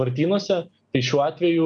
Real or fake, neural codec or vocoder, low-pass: real; none; 9.9 kHz